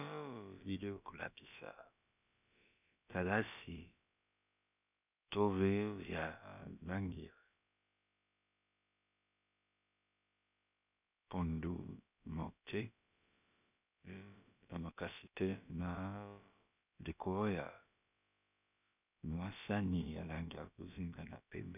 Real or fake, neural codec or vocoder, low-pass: fake; codec, 16 kHz, about 1 kbps, DyCAST, with the encoder's durations; 3.6 kHz